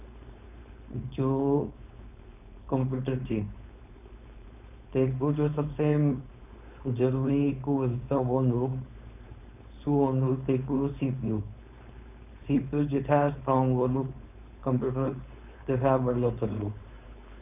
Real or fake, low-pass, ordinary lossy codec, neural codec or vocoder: fake; 3.6 kHz; none; codec, 16 kHz, 4.8 kbps, FACodec